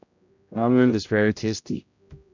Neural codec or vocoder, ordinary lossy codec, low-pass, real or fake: codec, 16 kHz, 0.5 kbps, X-Codec, HuBERT features, trained on general audio; none; 7.2 kHz; fake